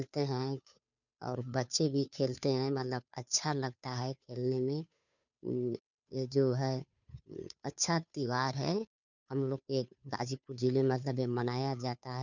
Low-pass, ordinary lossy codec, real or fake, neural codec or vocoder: 7.2 kHz; none; fake; codec, 16 kHz, 2 kbps, FunCodec, trained on Chinese and English, 25 frames a second